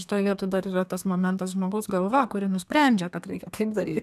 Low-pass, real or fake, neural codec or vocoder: 14.4 kHz; fake; codec, 32 kHz, 1.9 kbps, SNAC